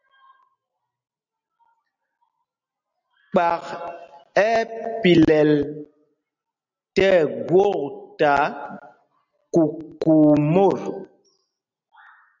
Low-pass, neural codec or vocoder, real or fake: 7.2 kHz; none; real